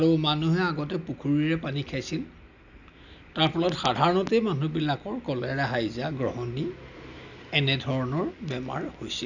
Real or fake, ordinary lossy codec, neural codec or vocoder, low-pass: real; none; none; 7.2 kHz